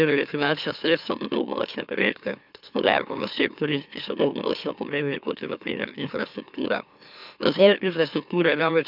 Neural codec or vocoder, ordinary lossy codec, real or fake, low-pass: autoencoder, 44.1 kHz, a latent of 192 numbers a frame, MeloTTS; none; fake; 5.4 kHz